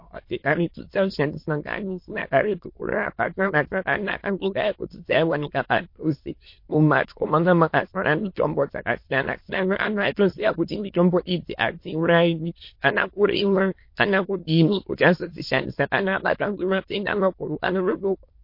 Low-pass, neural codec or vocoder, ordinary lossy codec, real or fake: 5.4 kHz; autoencoder, 22.05 kHz, a latent of 192 numbers a frame, VITS, trained on many speakers; MP3, 32 kbps; fake